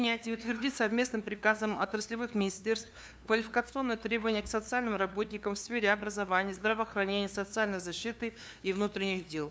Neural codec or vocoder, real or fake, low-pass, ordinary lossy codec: codec, 16 kHz, 2 kbps, FunCodec, trained on LibriTTS, 25 frames a second; fake; none; none